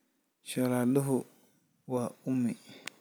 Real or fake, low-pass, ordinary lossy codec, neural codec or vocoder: real; none; none; none